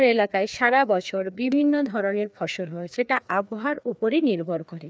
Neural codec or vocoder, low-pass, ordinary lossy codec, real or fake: codec, 16 kHz, 2 kbps, FreqCodec, larger model; none; none; fake